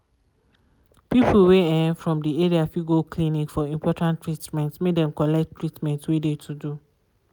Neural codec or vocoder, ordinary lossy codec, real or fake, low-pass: none; none; real; none